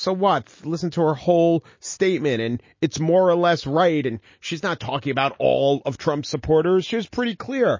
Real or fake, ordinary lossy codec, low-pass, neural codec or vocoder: real; MP3, 32 kbps; 7.2 kHz; none